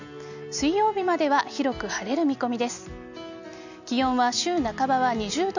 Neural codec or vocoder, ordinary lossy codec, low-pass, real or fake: none; none; 7.2 kHz; real